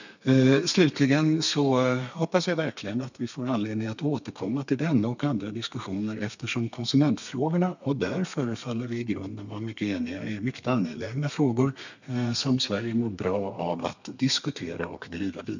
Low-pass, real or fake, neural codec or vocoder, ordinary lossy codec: 7.2 kHz; fake; codec, 32 kHz, 1.9 kbps, SNAC; none